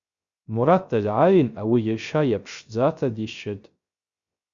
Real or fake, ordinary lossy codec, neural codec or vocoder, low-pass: fake; Opus, 64 kbps; codec, 16 kHz, 0.3 kbps, FocalCodec; 7.2 kHz